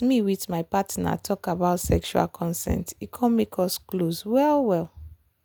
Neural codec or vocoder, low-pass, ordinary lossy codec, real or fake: none; none; none; real